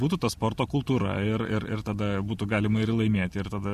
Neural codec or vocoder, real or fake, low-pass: none; real; 14.4 kHz